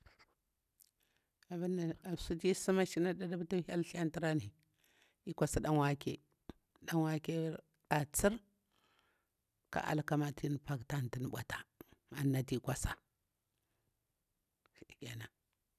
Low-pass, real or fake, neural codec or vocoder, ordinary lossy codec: 14.4 kHz; real; none; none